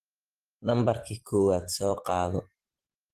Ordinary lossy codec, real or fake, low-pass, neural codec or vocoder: Opus, 16 kbps; fake; 14.4 kHz; vocoder, 44.1 kHz, 128 mel bands every 512 samples, BigVGAN v2